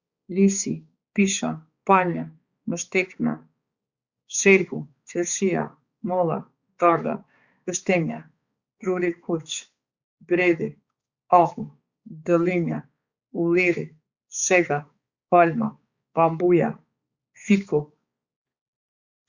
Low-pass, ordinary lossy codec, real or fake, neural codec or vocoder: 7.2 kHz; Opus, 64 kbps; fake; codec, 16 kHz, 4 kbps, X-Codec, HuBERT features, trained on balanced general audio